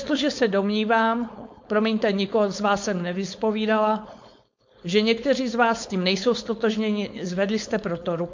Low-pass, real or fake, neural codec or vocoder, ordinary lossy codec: 7.2 kHz; fake; codec, 16 kHz, 4.8 kbps, FACodec; MP3, 48 kbps